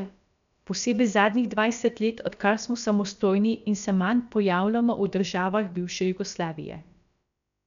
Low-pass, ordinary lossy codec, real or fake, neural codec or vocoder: 7.2 kHz; none; fake; codec, 16 kHz, about 1 kbps, DyCAST, with the encoder's durations